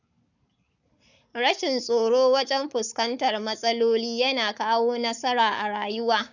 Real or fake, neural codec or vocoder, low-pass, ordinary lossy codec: fake; codec, 44.1 kHz, 7.8 kbps, Pupu-Codec; 7.2 kHz; none